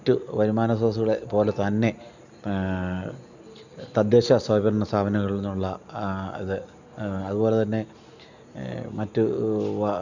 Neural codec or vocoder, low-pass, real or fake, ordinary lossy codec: none; 7.2 kHz; real; none